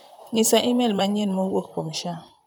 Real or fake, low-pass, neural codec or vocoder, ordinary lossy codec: fake; none; vocoder, 44.1 kHz, 128 mel bands, Pupu-Vocoder; none